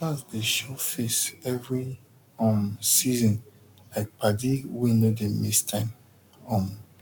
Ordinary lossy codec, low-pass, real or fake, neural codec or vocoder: none; 19.8 kHz; fake; codec, 44.1 kHz, 7.8 kbps, Pupu-Codec